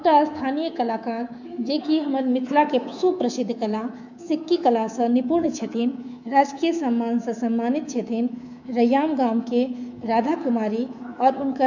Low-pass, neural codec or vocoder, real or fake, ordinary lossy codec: 7.2 kHz; codec, 24 kHz, 3.1 kbps, DualCodec; fake; none